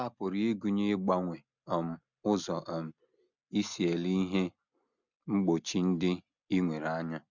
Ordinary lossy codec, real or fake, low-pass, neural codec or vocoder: none; real; 7.2 kHz; none